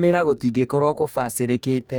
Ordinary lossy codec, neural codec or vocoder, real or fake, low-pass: none; codec, 44.1 kHz, 2.6 kbps, DAC; fake; none